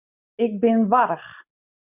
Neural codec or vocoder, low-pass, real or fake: none; 3.6 kHz; real